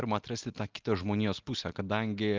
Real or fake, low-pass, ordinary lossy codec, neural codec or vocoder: real; 7.2 kHz; Opus, 32 kbps; none